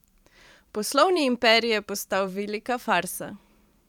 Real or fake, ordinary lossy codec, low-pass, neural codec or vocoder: fake; none; 19.8 kHz; vocoder, 44.1 kHz, 128 mel bands every 512 samples, BigVGAN v2